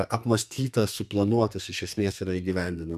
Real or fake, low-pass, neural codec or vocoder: fake; 14.4 kHz; codec, 32 kHz, 1.9 kbps, SNAC